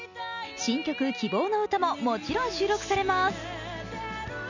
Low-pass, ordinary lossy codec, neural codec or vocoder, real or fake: 7.2 kHz; none; none; real